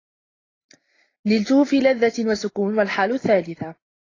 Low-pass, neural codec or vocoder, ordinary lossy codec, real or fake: 7.2 kHz; none; AAC, 32 kbps; real